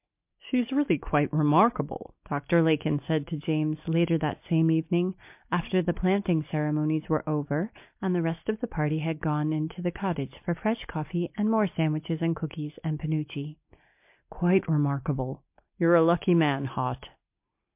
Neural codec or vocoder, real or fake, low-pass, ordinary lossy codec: none; real; 3.6 kHz; MP3, 32 kbps